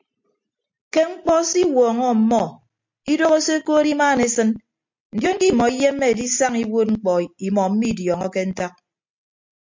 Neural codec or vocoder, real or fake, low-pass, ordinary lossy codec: none; real; 7.2 kHz; MP3, 48 kbps